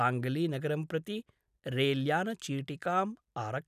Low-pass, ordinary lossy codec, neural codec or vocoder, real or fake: 14.4 kHz; none; none; real